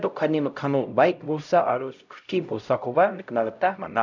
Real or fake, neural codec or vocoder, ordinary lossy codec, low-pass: fake; codec, 16 kHz, 0.5 kbps, X-Codec, HuBERT features, trained on LibriSpeech; none; 7.2 kHz